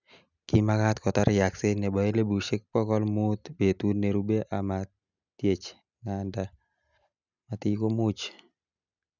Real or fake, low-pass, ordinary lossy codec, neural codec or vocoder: real; 7.2 kHz; none; none